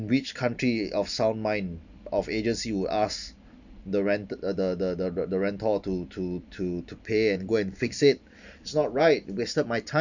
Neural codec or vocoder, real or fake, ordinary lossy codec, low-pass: none; real; none; 7.2 kHz